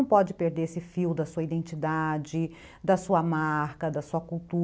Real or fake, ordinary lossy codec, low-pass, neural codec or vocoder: real; none; none; none